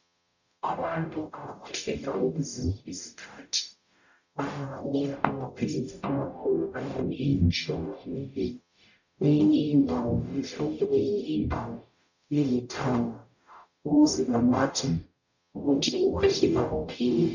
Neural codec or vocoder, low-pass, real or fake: codec, 44.1 kHz, 0.9 kbps, DAC; 7.2 kHz; fake